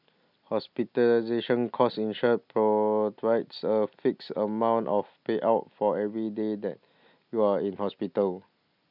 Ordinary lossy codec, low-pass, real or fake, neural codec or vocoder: none; 5.4 kHz; real; none